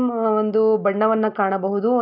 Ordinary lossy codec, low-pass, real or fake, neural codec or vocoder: none; 5.4 kHz; real; none